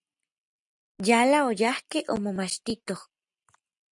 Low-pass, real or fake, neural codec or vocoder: 10.8 kHz; real; none